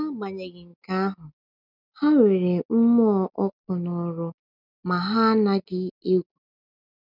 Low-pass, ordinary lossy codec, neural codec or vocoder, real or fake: 5.4 kHz; none; none; real